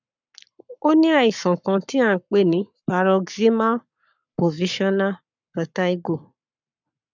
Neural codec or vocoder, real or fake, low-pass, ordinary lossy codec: codec, 44.1 kHz, 7.8 kbps, Pupu-Codec; fake; 7.2 kHz; none